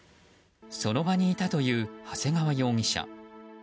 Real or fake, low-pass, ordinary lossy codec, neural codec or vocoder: real; none; none; none